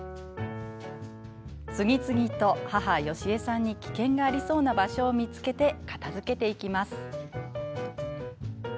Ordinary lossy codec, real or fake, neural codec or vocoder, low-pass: none; real; none; none